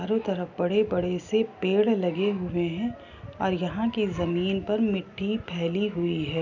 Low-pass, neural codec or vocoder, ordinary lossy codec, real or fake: 7.2 kHz; none; none; real